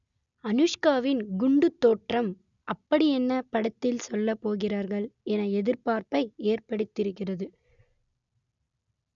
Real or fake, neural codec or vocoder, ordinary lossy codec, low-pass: real; none; none; 7.2 kHz